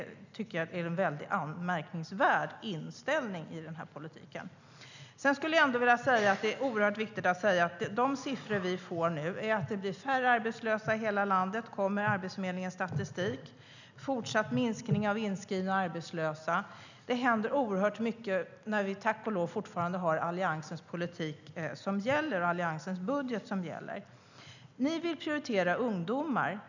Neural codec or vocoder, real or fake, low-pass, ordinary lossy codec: none; real; 7.2 kHz; none